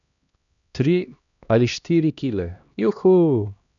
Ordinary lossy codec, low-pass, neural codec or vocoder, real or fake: none; 7.2 kHz; codec, 16 kHz, 1 kbps, X-Codec, HuBERT features, trained on LibriSpeech; fake